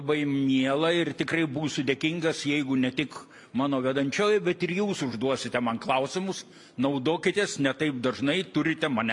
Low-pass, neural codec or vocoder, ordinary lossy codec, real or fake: 10.8 kHz; none; MP3, 64 kbps; real